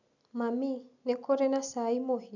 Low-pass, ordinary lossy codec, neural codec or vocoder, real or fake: 7.2 kHz; none; none; real